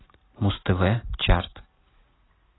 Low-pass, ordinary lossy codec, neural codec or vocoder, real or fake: 7.2 kHz; AAC, 16 kbps; none; real